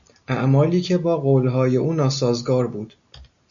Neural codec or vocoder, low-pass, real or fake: none; 7.2 kHz; real